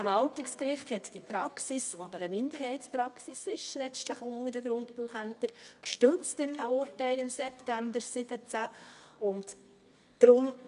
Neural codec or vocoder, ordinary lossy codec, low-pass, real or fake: codec, 24 kHz, 0.9 kbps, WavTokenizer, medium music audio release; MP3, 96 kbps; 10.8 kHz; fake